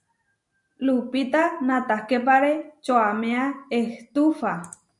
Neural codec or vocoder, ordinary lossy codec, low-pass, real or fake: none; MP3, 96 kbps; 10.8 kHz; real